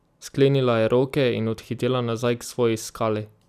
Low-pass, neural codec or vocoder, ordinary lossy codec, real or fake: 14.4 kHz; vocoder, 44.1 kHz, 128 mel bands every 256 samples, BigVGAN v2; none; fake